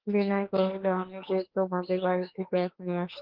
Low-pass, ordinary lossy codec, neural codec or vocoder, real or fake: 5.4 kHz; Opus, 16 kbps; autoencoder, 48 kHz, 32 numbers a frame, DAC-VAE, trained on Japanese speech; fake